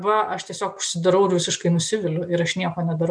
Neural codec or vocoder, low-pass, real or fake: none; 9.9 kHz; real